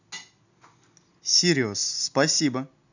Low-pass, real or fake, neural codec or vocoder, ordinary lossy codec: 7.2 kHz; real; none; none